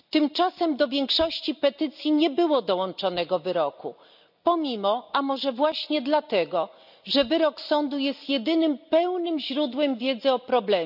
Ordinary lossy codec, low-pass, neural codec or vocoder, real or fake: none; 5.4 kHz; none; real